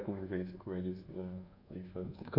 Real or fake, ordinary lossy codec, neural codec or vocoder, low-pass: fake; none; codec, 24 kHz, 1.2 kbps, DualCodec; 5.4 kHz